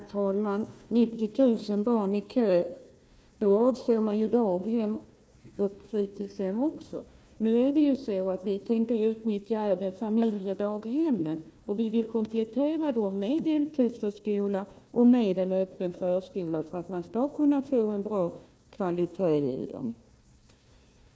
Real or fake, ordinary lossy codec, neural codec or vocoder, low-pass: fake; none; codec, 16 kHz, 1 kbps, FunCodec, trained on Chinese and English, 50 frames a second; none